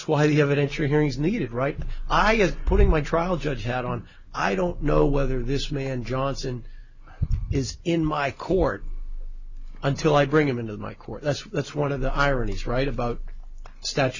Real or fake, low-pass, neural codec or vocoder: real; 7.2 kHz; none